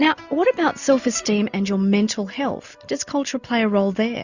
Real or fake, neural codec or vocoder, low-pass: real; none; 7.2 kHz